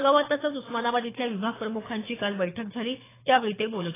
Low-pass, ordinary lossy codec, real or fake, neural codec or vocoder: 3.6 kHz; AAC, 16 kbps; fake; codec, 24 kHz, 6 kbps, HILCodec